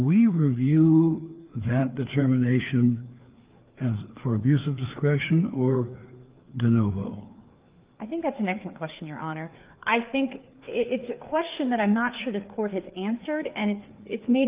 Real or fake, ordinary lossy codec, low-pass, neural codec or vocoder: fake; Opus, 32 kbps; 3.6 kHz; codec, 16 kHz, 4 kbps, FreqCodec, larger model